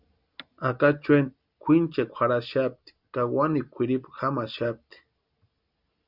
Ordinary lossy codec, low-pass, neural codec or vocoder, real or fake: Opus, 64 kbps; 5.4 kHz; none; real